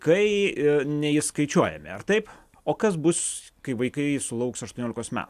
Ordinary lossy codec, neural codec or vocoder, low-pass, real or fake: AAC, 96 kbps; none; 14.4 kHz; real